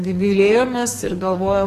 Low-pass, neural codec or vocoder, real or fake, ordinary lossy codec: 14.4 kHz; codec, 44.1 kHz, 2.6 kbps, SNAC; fake; AAC, 48 kbps